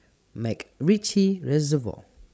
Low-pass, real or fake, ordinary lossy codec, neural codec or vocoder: none; fake; none; codec, 16 kHz, 16 kbps, FreqCodec, larger model